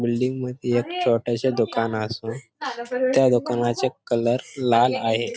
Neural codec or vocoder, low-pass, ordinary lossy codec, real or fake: none; none; none; real